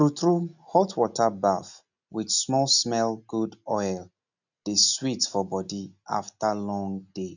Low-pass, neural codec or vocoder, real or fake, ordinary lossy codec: 7.2 kHz; none; real; none